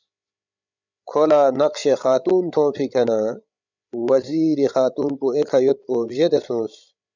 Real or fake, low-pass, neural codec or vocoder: fake; 7.2 kHz; codec, 16 kHz, 16 kbps, FreqCodec, larger model